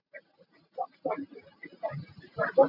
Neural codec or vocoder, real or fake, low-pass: none; real; 5.4 kHz